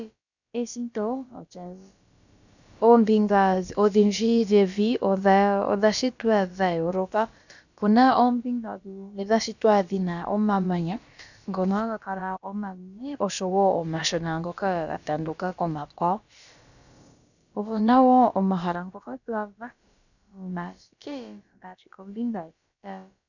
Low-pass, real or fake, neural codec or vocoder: 7.2 kHz; fake; codec, 16 kHz, about 1 kbps, DyCAST, with the encoder's durations